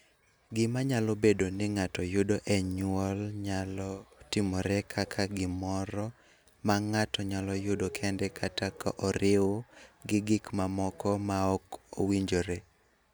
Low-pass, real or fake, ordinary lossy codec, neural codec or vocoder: none; real; none; none